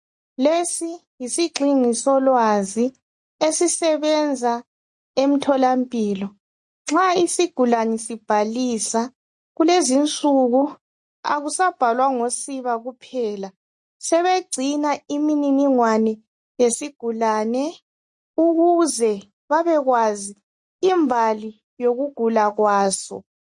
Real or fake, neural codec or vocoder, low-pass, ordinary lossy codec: real; none; 10.8 kHz; MP3, 48 kbps